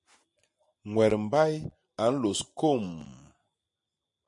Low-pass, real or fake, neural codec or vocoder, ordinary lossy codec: 10.8 kHz; real; none; MP3, 48 kbps